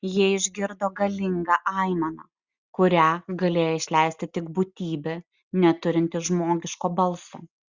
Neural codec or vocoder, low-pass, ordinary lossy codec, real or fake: none; 7.2 kHz; Opus, 64 kbps; real